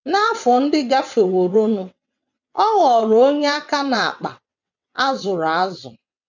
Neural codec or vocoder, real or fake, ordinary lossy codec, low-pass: vocoder, 22.05 kHz, 80 mel bands, WaveNeXt; fake; none; 7.2 kHz